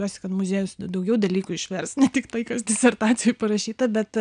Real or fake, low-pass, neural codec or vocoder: real; 9.9 kHz; none